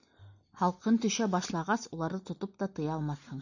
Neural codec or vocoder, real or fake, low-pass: none; real; 7.2 kHz